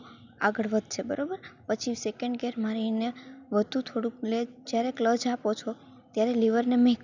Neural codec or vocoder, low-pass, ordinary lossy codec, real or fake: none; 7.2 kHz; none; real